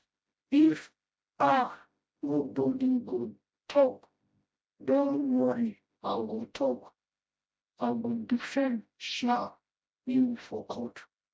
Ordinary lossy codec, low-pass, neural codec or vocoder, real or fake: none; none; codec, 16 kHz, 0.5 kbps, FreqCodec, smaller model; fake